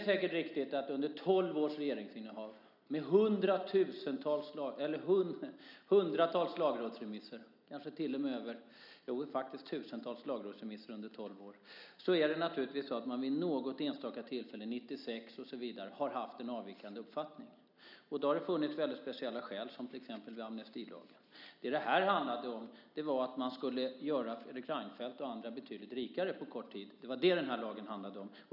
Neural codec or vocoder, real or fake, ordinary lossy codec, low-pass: none; real; none; 5.4 kHz